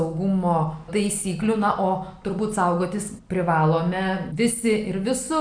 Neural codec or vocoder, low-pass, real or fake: none; 9.9 kHz; real